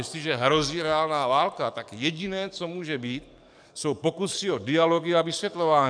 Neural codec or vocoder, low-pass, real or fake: codec, 44.1 kHz, 7.8 kbps, DAC; 9.9 kHz; fake